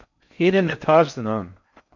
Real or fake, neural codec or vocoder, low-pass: fake; codec, 16 kHz in and 24 kHz out, 0.6 kbps, FocalCodec, streaming, 4096 codes; 7.2 kHz